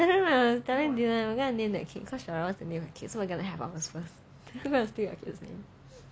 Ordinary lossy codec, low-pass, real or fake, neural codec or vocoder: none; none; real; none